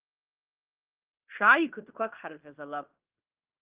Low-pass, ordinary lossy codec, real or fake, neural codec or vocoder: 3.6 kHz; Opus, 32 kbps; fake; codec, 16 kHz in and 24 kHz out, 0.9 kbps, LongCat-Audio-Codec, fine tuned four codebook decoder